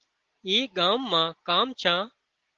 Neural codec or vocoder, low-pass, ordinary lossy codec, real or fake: none; 7.2 kHz; Opus, 16 kbps; real